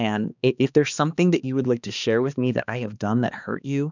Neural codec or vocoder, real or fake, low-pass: codec, 16 kHz, 2 kbps, X-Codec, HuBERT features, trained on balanced general audio; fake; 7.2 kHz